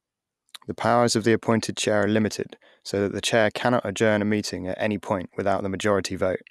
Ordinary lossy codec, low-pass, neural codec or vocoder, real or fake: none; none; none; real